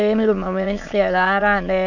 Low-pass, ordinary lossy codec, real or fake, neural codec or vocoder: 7.2 kHz; none; fake; autoencoder, 22.05 kHz, a latent of 192 numbers a frame, VITS, trained on many speakers